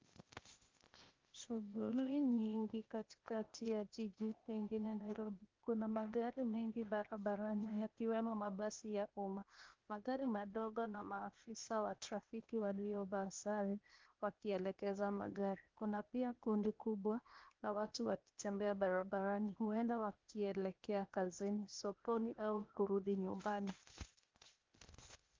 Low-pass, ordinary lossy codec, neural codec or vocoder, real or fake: 7.2 kHz; Opus, 24 kbps; codec, 16 kHz, 0.8 kbps, ZipCodec; fake